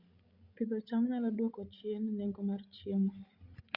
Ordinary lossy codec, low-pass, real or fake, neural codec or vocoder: Opus, 64 kbps; 5.4 kHz; fake; codec, 16 kHz, 16 kbps, FreqCodec, larger model